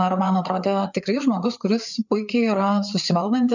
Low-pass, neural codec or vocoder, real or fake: 7.2 kHz; codec, 16 kHz, 4 kbps, FreqCodec, larger model; fake